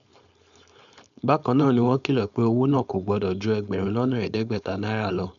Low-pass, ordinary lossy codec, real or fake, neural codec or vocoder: 7.2 kHz; none; fake; codec, 16 kHz, 4.8 kbps, FACodec